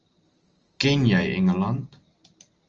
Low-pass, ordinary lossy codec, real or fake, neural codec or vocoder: 7.2 kHz; Opus, 24 kbps; real; none